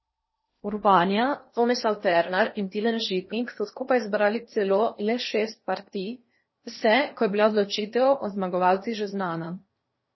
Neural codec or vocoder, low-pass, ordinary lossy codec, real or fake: codec, 16 kHz in and 24 kHz out, 0.8 kbps, FocalCodec, streaming, 65536 codes; 7.2 kHz; MP3, 24 kbps; fake